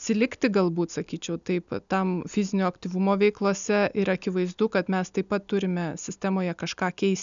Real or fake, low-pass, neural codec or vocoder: real; 7.2 kHz; none